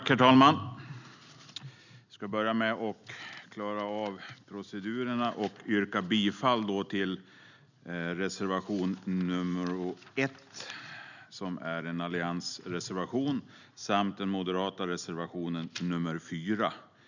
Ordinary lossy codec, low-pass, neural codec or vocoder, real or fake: none; 7.2 kHz; none; real